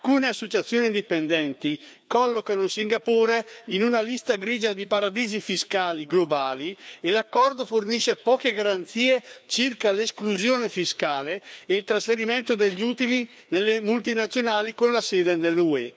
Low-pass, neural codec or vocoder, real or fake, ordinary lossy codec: none; codec, 16 kHz, 2 kbps, FreqCodec, larger model; fake; none